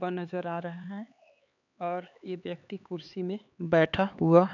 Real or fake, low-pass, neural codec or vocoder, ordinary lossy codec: fake; 7.2 kHz; codec, 16 kHz, 4 kbps, X-Codec, HuBERT features, trained on LibriSpeech; none